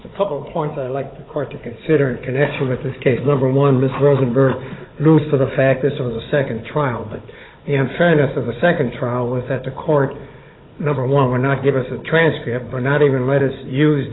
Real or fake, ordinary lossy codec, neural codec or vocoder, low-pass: fake; AAC, 16 kbps; codec, 16 kHz, 16 kbps, FunCodec, trained on Chinese and English, 50 frames a second; 7.2 kHz